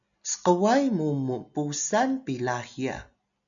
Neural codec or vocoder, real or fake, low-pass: none; real; 7.2 kHz